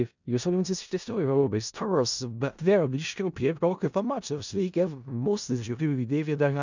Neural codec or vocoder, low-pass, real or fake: codec, 16 kHz in and 24 kHz out, 0.4 kbps, LongCat-Audio-Codec, four codebook decoder; 7.2 kHz; fake